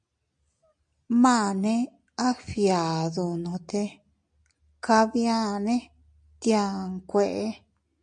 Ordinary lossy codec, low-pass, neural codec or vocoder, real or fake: MP3, 64 kbps; 9.9 kHz; none; real